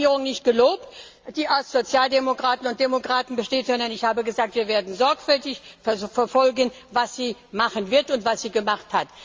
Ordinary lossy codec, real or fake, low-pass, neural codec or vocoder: Opus, 32 kbps; real; 7.2 kHz; none